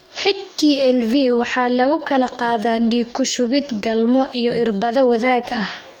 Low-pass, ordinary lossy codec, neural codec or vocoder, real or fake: 19.8 kHz; none; codec, 44.1 kHz, 2.6 kbps, DAC; fake